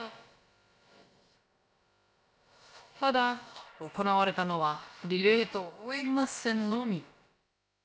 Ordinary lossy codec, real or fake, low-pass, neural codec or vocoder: none; fake; none; codec, 16 kHz, about 1 kbps, DyCAST, with the encoder's durations